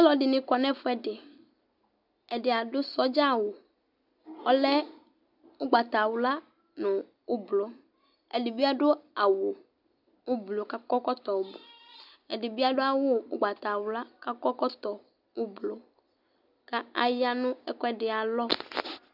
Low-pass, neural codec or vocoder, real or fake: 5.4 kHz; none; real